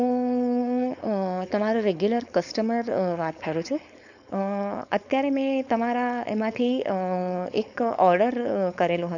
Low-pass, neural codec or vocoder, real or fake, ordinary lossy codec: 7.2 kHz; codec, 16 kHz, 4.8 kbps, FACodec; fake; none